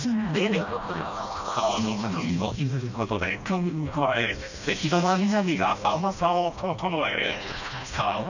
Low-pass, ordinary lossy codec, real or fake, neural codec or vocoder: 7.2 kHz; none; fake; codec, 16 kHz, 1 kbps, FreqCodec, smaller model